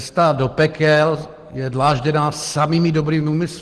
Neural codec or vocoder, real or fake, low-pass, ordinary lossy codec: none; real; 10.8 kHz; Opus, 16 kbps